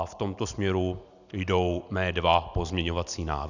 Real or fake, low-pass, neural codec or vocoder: real; 7.2 kHz; none